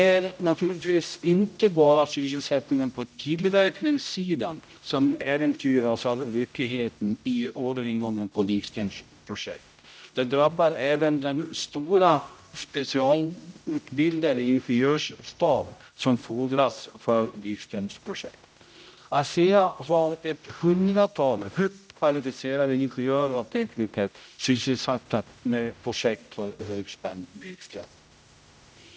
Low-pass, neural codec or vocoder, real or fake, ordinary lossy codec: none; codec, 16 kHz, 0.5 kbps, X-Codec, HuBERT features, trained on general audio; fake; none